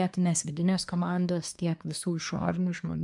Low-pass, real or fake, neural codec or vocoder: 10.8 kHz; fake; codec, 24 kHz, 1 kbps, SNAC